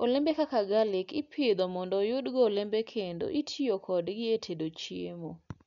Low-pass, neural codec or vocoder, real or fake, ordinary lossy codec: 7.2 kHz; none; real; none